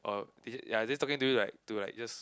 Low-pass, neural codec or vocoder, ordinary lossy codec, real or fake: none; none; none; real